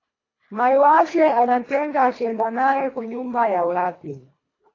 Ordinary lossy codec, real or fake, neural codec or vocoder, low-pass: AAC, 32 kbps; fake; codec, 24 kHz, 1.5 kbps, HILCodec; 7.2 kHz